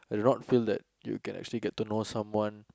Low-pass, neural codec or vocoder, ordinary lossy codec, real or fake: none; none; none; real